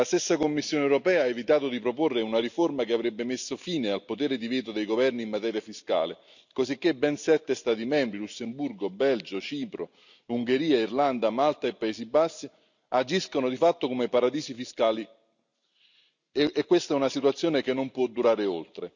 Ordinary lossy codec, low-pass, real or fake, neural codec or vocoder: none; 7.2 kHz; real; none